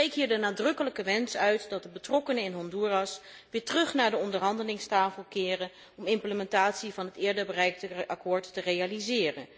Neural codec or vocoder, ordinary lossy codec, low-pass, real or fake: none; none; none; real